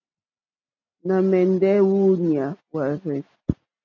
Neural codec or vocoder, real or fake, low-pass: none; real; 7.2 kHz